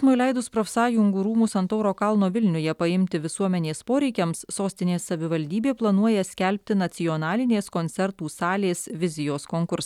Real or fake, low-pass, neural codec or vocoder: real; 19.8 kHz; none